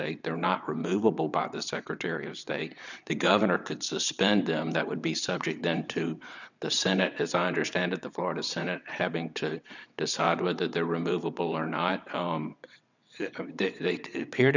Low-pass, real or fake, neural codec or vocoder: 7.2 kHz; fake; vocoder, 22.05 kHz, 80 mel bands, WaveNeXt